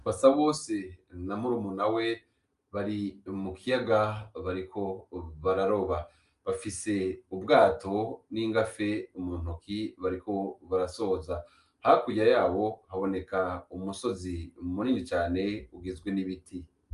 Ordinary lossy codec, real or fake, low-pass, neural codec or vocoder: Opus, 32 kbps; real; 10.8 kHz; none